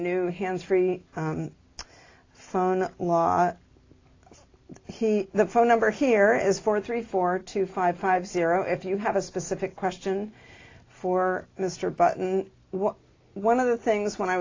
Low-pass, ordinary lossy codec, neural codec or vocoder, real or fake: 7.2 kHz; AAC, 32 kbps; none; real